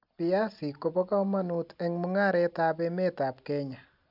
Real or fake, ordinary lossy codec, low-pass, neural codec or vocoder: real; none; 5.4 kHz; none